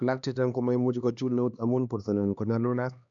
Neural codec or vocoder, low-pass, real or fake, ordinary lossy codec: codec, 16 kHz, 2 kbps, X-Codec, HuBERT features, trained on LibriSpeech; 7.2 kHz; fake; none